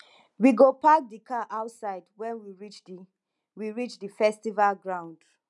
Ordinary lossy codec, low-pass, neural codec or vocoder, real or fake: none; none; none; real